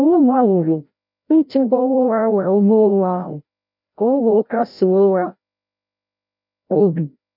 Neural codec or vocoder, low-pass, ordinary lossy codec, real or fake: codec, 16 kHz, 0.5 kbps, FreqCodec, larger model; 5.4 kHz; none; fake